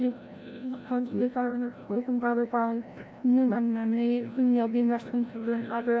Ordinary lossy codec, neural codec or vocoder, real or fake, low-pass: none; codec, 16 kHz, 0.5 kbps, FreqCodec, larger model; fake; none